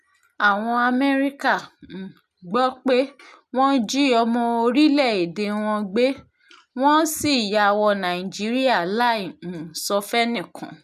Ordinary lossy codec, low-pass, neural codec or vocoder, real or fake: none; 14.4 kHz; none; real